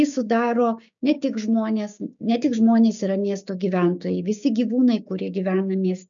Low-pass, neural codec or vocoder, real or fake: 7.2 kHz; codec, 16 kHz, 6 kbps, DAC; fake